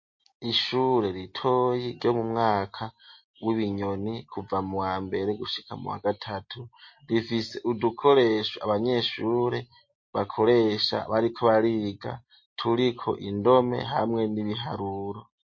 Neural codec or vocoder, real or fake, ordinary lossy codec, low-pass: none; real; MP3, 32 kbps; 7.2 kHz